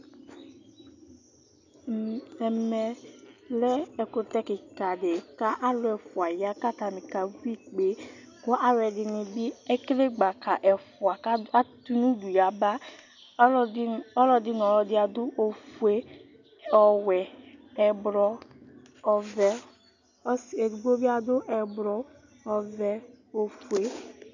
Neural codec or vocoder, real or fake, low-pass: none; real; 7.2 kHz